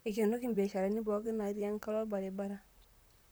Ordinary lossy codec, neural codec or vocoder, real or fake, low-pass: none; vocoder, 44.1 kHz, 128 mel bands, Pupu-Vocoder; fake; none